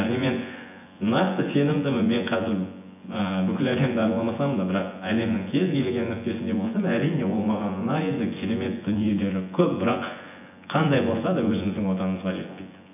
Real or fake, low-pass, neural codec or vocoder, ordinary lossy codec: fake; 3.6 kHz; vocoder, 24 kHz, 100 mel bands, Vocos; none